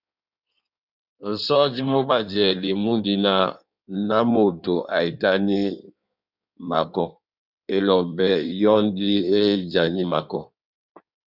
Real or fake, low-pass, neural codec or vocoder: fake; 5.4 kHz; codec, 16 kHz in and 24 kHz out, 1.1 kbps, FireRedTTS-2 codec